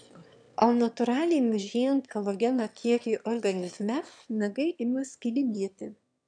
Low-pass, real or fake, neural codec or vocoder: 9.9 kHz; fake; autoencoder, 22.05 kHz, a latent of 192 numbers a frame, VITS, trained on one speaker